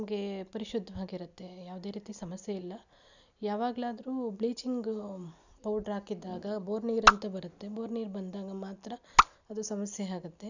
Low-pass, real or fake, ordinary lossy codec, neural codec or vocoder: 7.2 kHz; real; none; none